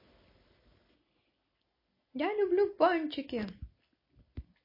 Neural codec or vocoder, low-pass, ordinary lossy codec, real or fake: none; 5.4 kHz; MP3, 24 kbps; real